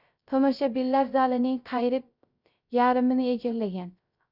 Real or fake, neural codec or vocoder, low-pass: fake; codec, 16 kHz, 0.3 kbps, FocalCodec; 5.4 kHz